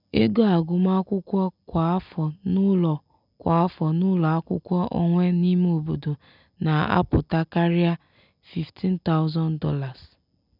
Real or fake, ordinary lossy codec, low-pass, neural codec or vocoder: real; none; 5.4 kHz; none